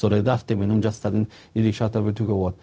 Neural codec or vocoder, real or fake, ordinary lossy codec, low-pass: codec, 16 kHz, 0.4 kbps, LongCat-Audio-Codec; fake; none; none